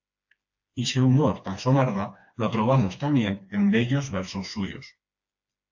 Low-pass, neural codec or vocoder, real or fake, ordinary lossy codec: 7.2 kHz; codec, 16 kHz, 2 kbps, FreqCodec, smaller model; fake; AAC, 48 kbps